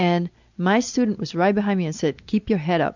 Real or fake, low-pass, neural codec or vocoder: real; 7.2 kHz; none